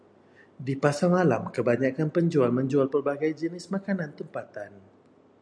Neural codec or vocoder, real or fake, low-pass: none; real; 9.9 kHz